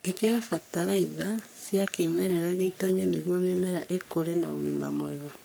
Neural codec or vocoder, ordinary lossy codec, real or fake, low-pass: codec, 44.1 kHz, 3.4 kbps, Pupu-Codec; none; fake; none